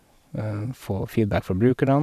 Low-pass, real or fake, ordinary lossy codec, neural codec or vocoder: 14.4 kHz; fake; none; vocoder, 44.1 kHz, 128 mel bands every 512 samples, BigVGAN v2